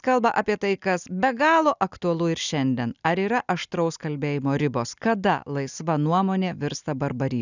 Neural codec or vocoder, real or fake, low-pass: none; real; 7.2 kHz